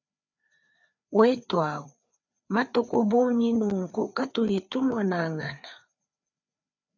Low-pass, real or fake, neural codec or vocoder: 7.2 kHz; fake; codec, 16 kHz, 4 kbps, FreqCodec, larger model